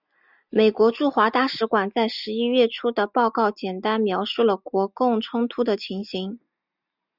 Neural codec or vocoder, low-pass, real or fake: none; 5.4 kHz; real